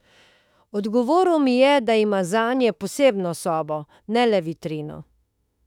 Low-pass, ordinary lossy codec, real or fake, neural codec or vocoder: 19.8 kHz; none; fake; autoencoder, 48 kHz, 32 numbers a frame, DAC-VAE, trained on Japanese speech